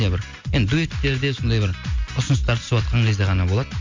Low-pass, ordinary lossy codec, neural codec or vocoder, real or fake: 7.2 kHz; MP3, 48 kbps; none; real